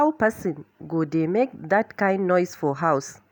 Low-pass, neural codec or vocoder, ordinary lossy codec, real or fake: none; none; none; real